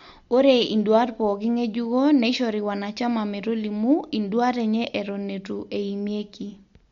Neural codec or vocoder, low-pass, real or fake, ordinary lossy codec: none; 7.2 kHz; real; MP3, 48 kbps